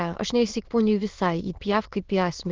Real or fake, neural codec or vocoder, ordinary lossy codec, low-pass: fake; autoencoder, 22.05 kHz, a latent of 192 numbers a frame, VITS, trained on many speakers; Opus, 16 kbps; 7.2 kHz